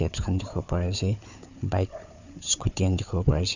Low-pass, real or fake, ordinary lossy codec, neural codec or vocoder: 7.2 kHz; fake; none; codec, 16 kHz, 4 kbps, FunCodec, trained on Chinese and English, 50 frames a second